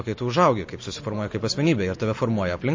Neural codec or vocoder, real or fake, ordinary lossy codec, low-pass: none; real; MP3, 32 kbps; 7.2 kHz